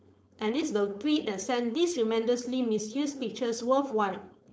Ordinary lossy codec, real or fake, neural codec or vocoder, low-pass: none; fake; codec, 16 kHz, 4.8 kbps, FACodec; none